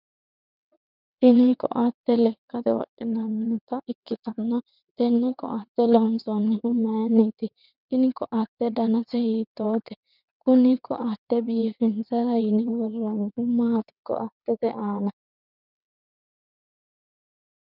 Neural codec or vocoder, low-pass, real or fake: vocoder, 22.05 kHz, 80 mel bands, WaveNeXt; 5.4 kHz; fake